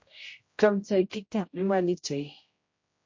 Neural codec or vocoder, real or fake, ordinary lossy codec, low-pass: codec, 16 kHz, 0.5 kbps, X-Codec, HuBERT features, trained on general audio; fake; MP3, 48 kbps; 7.2 kHz